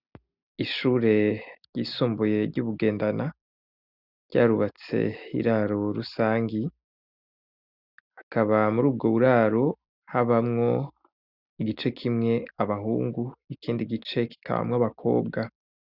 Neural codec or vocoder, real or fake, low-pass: none; real; 5.4 kHz